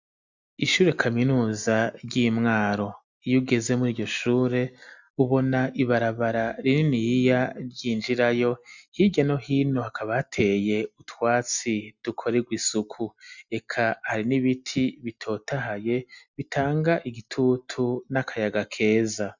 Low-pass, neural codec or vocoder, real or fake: 7.2 kHz; none; real